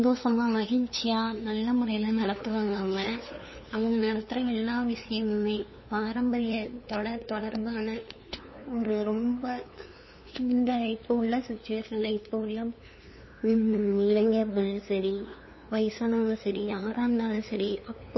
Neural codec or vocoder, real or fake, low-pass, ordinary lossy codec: codec, 16 kHz, 2 kbps, FunCodec, trained on LibriTTS, 25 frames a second; fake; 7.2 kHz; MP3, 24 kbps